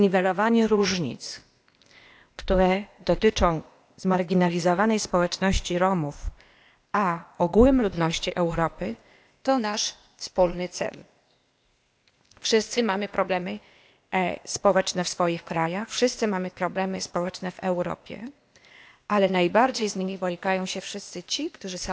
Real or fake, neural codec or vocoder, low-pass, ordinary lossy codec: fake; codec, 16 kHz, 0.8 kbps, ZipCodec; none; none